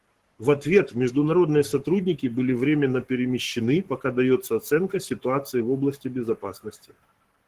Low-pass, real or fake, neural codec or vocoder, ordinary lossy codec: 14.4 kHz; fake; codec, 44.1 kHz, 7.8 kbps, Pupu-Codec; Opus, 16 kbps